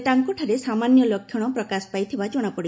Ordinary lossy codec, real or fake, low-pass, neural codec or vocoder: none; real; none; none